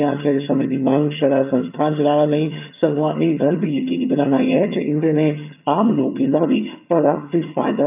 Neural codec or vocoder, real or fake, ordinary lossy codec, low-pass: vocoder, 22.05 kHz, 80 mel bands, HiFi-GAN; fake; none; 3.6 kHz